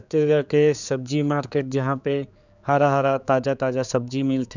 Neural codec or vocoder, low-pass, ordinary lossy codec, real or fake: codec, 16 kHz, 4 kbps, X-Codec, HuBERT features, trained on general audio; 7.2 kHz; none; fake